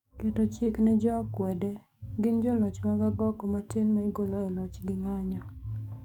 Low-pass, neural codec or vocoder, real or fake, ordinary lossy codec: 19.8 kHz; codec, 44.1 kHz, 7.8 kbps, DAC; fake; none